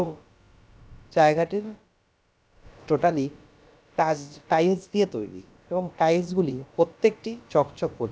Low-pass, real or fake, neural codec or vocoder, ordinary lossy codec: none; fake; codec, 16 kHz, about 1 kbps, DyCAST, with the encoder's durations; none